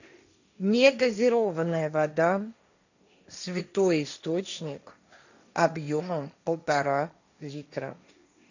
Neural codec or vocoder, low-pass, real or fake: codec, 16 kHz, 1.1 kbps, Voila-Tokenizer; 7.2 kHz; fake